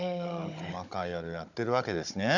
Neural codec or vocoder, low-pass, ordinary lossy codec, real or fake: codec, 16 kHz, 16 kbps, FunCodec, trained on Chinese and English, 50 frames a second; 7.2 kHz; none; fake